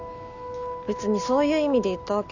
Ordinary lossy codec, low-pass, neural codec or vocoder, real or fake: none; 7.2 kHz; none; real